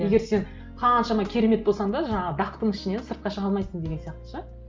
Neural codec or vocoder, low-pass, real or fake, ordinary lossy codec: none; 7.2 kHz; real; Opus, 32 kbps